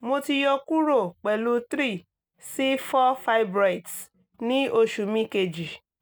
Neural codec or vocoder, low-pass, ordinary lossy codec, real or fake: none; none; none; real